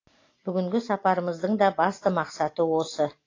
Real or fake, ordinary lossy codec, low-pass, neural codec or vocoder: real; AAC, 32 kbps; 7.2 kHz; none